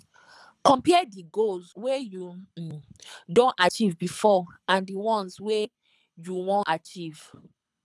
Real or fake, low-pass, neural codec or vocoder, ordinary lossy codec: fake; none; codec, 24 kHz, 6 kbps, HILCodec; none